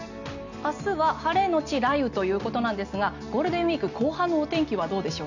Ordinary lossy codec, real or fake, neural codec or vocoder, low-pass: none; real; none; 7.2 kHz